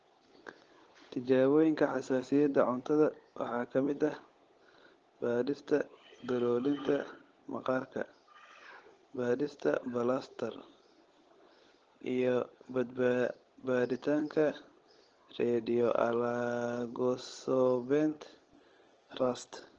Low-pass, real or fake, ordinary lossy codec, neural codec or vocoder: 7.2 kHz; fake; Opus, 16 kbps; codec, 16 kHz, 16 kbps, FunCodec, trained on LibriTTS, 50 frames a second